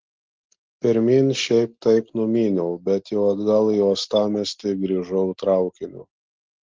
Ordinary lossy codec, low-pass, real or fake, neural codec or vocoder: Opus, 16 kbps; 7.2 kHz; real; none